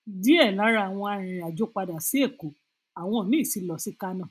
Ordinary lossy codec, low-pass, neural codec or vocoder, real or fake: none; 14.4 kHz; none; real